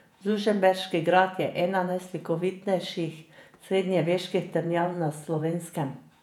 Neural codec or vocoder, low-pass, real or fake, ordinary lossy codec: vocoder, 48 kHz, 128 mel bands, Vocos; 19.8 kHz; fake; none